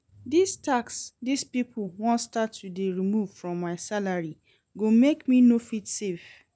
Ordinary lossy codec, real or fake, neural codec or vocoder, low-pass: none; real; none; none